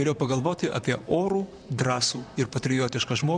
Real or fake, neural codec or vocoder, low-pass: fake; vocoder, 44.1 kHz, 128 mel bands, Pupu-Vocoder; 9.9 kHz